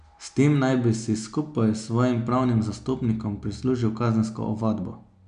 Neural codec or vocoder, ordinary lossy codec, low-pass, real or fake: none; none; 9.9 kHz; real